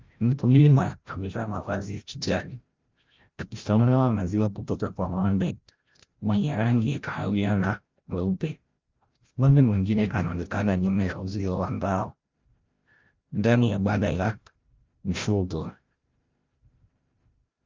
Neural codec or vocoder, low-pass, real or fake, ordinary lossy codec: codec, 16 kHz, 0.5 kbps, FreqCodec, larger model; 7.2 kHz; fake; Opus, 32 kbps